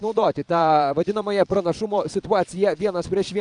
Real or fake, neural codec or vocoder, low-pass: fake; vocoder, 22.05 kHz, 80 mel bands, Vocos; 9.9 kHz